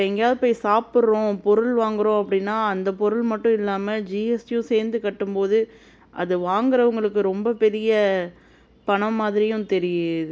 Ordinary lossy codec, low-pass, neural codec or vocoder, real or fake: none; none; none; real